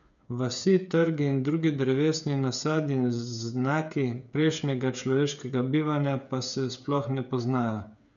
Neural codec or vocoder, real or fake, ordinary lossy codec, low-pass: codec, 16 kHz, 8 kbps, FreqCodec, smaller model; fake; none; 7.2 kHz